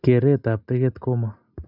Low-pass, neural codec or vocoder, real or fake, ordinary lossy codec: 5.4 kHz; none; real; none